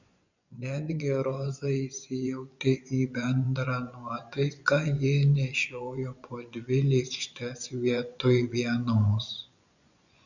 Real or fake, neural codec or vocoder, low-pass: fake; vocoder, 24 kHz, 100 mel bands, Vocos; 7.2 kHz